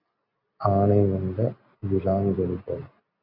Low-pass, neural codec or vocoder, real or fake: 5.4 kHz; none; real